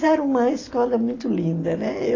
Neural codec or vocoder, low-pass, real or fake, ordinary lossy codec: none; 7.2 kHz; real; AAC, 32 kbps